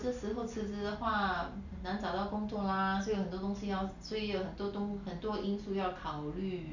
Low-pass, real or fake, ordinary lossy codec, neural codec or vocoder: 7.2 kHz; real; none; none